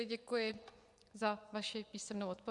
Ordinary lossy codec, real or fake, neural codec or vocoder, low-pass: Opus, 64 kbps; fake; vocoder, 24 kHz, 100 mel bands, Vocos; 10.8 kHz